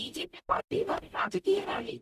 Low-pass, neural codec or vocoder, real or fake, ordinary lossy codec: 14.4 kHz; codec, 44.1 kHz, 0.9 kbps, DAC; fake; none